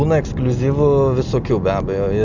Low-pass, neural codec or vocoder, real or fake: 7.2 kHz; none; real